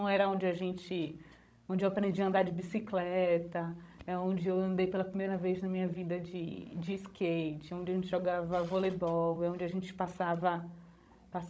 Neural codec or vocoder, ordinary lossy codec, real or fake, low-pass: codec, 16 kHz, 16 kbps, FreqCodec, larger model; none; fake; none